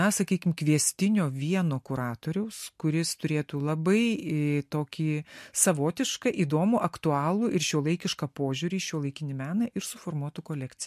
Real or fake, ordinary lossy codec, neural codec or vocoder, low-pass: real; MP3, 64 kbps; none; 14.4 kHz